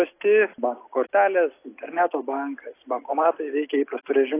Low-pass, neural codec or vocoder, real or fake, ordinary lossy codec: 3.6 kHz; none; real; AAC, 24 kbps